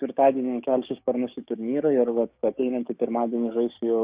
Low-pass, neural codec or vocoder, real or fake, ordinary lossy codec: 3.6 kHz; codec, 16 kHz, 6 kbps, DAC; fake; Opus, 64 kbps